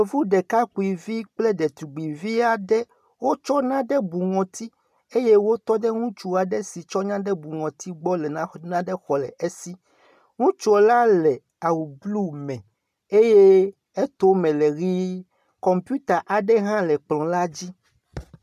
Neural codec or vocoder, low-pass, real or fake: none; 14.4 kHz; real